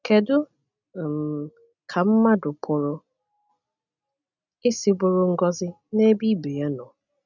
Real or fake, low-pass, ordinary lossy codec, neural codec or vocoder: real; 7.2 kHz; none; none